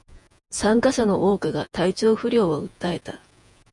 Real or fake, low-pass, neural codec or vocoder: fake; 10.8 kHz; vocoder, 48 kHz, 128 mel bands, Vocos